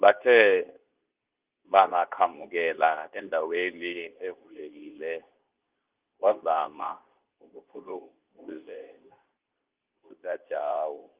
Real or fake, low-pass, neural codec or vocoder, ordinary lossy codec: fake; 3.6 kHz; codec, 24 kHz, 0.9 kbps, WavTokenizer, medium speech release version 2; Opus, 32 kbps